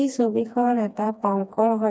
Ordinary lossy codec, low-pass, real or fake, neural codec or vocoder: none; none; fake; codec, 16 kHz, 2 kbps, FreqCodec, smaller model